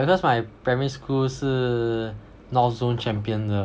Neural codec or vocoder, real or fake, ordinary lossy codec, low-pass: none; real; none; none